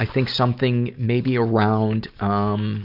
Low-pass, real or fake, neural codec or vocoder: 5.4 kHz; real; none